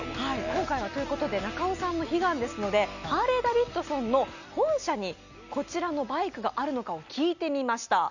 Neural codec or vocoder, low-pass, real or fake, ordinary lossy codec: none; 7.2 kHz; real; none